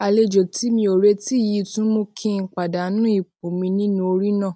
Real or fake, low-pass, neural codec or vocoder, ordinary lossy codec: real; none; none; none